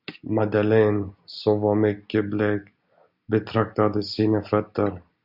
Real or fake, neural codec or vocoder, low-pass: real; none; 5.4 kHz